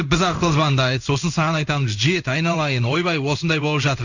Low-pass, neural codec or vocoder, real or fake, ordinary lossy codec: 7.2 kHz; codec, 16 kHz in and 24 kHz out, 1 kbps, XY-Tokenizer; fake; none